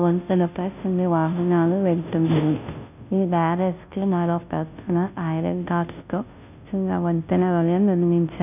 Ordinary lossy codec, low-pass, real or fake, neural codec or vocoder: none; 3.6 kHz; fake; codec, 16 kHz, 0.5 kbps, FunCodec, trained on Chinese and English, 25 frames a second